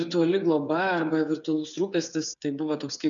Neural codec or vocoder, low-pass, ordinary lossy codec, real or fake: codec, 16 kHz, 8 kbps, FreqCodec, smaller model; 7.2 kHz; MP3, 96 kbps; fake